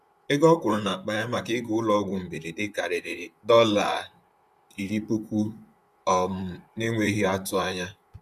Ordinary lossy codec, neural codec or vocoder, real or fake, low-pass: none; vocoder, 44.1 kHz, 128 mel bands, Pupu-Vocoder; fake; 14.4 kHz